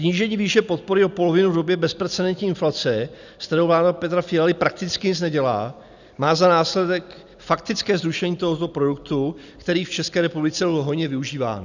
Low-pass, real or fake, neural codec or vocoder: 7.2 kHz; real; none